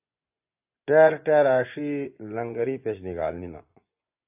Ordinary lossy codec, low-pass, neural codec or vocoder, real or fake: AAC, 32 kbps; 3.6 kHz; vocoder, 24 kHz, 100 mel bands, Vocos; fake